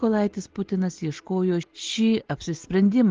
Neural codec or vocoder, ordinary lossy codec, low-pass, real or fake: none; Opus, 16 kbps; 7.2 kHz; real